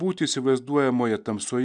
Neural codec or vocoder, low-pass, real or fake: none; 9.9 kHz; real